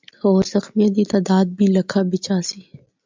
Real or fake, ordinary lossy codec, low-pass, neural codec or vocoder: real; MP3, 64 kbps; 7.2 kHz; none